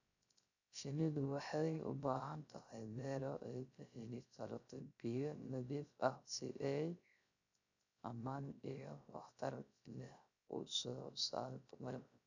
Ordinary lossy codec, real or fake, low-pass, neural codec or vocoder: none; fake; 7.2 kHz; codec, 16 kHz, 0.3 kbps, FocalCodec